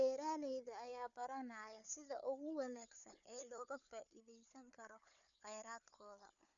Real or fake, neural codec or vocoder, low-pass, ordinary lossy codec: fake; codec, 16 kHz, 4 kbps, FunCodec, trained on Chinese and English, 50 frames a second; 7.2 kHz; none